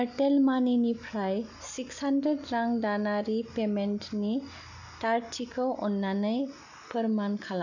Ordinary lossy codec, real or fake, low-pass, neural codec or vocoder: none; real; 7.2 kHz; none